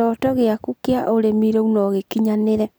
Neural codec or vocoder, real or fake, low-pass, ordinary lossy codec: none; real; none; none